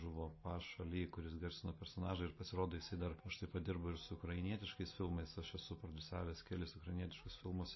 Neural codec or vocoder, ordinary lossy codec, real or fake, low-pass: none; MP3, 24 kbps; real; 7.2 kHz